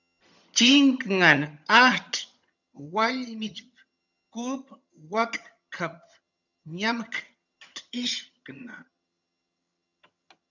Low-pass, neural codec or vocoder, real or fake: 7.2 kHz; vocoder, 22.05 kHz, 80 mel bands, HiFi-GAN; fake